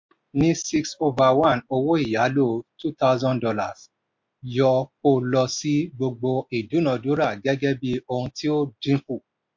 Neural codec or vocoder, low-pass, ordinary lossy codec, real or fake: none; 7.2 kHz; MP3, 48 kbps; real